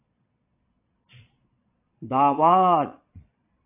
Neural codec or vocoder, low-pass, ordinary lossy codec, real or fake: vocoder, 22.05 kHz, 80 mel bands, Vocos; 3.6 kHz; AAC, 32 kbps; fake